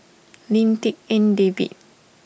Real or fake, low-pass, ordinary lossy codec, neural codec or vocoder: real; none; none; none